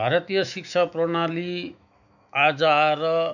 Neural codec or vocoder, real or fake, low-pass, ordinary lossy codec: autoencoder, 48 kHz, 128 numbers a frame, DAC-VAE, trained on Japanese speech; fake; 7.2 kHz; none